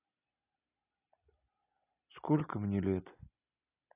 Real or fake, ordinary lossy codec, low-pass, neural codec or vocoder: real; AAC, 24 kbps; 3.6 kHz; none